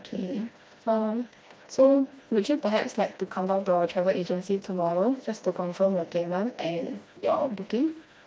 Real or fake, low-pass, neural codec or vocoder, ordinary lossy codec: fake; none; codec, 16 kHz, 1 kbps, FreqCodec, smaller model; none